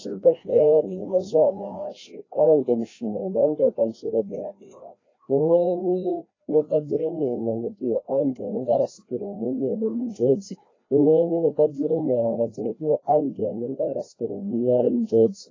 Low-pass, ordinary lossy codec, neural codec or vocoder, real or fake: 7.2 kHz; AAC, 32 kbps; codec, 16 kHz, 1 kbps, FreqCodec, larger model; fake